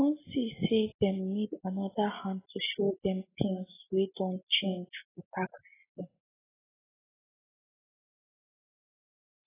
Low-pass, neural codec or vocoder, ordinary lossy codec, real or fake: 3.6 kHz; none; AAC, 16 kbps; real